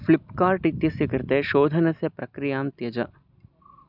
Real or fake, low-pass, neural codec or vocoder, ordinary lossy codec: real; 5.4 kHz; none; none